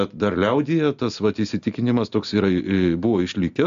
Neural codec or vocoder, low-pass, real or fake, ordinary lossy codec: none; 7.2 kHz; real; AAC, 96 kbps